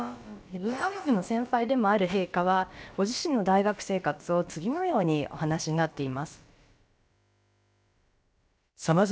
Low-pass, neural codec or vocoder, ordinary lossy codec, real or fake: none; codec, 16 kHz, about 1 kbps, DyCAST, with the encoder's durations; none; fake